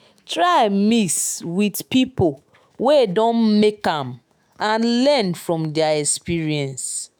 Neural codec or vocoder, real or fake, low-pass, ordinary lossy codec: autoencoder, 48 kHz, 128 numbers a frame, DAC-VAE, trained on Japanese speech; fake; none; none